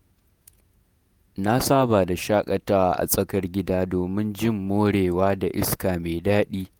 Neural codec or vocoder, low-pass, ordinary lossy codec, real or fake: vocoder, 48 kHz, 128 mel bands, Vocos; none; none; fake